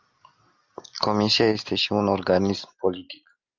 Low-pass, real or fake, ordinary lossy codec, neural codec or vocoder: 7.2 kHz; real; Opus, 32 kbps; none